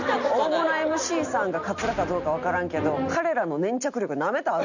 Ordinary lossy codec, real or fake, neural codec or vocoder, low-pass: none; real; none; 7.2 kHz